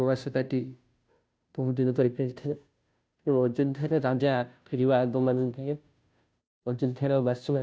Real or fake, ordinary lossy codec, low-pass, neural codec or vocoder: fake; none; none; codec, 16 kHz, 0.5 kbps, FunCodec, trained on Chinese and English, 25 frames a second